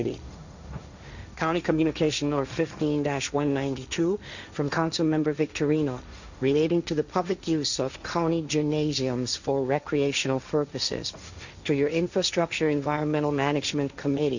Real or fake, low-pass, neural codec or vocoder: fake; 7.2 kHz; codec, 16 kHz, 1.1 kbps, Voila-Tokenizer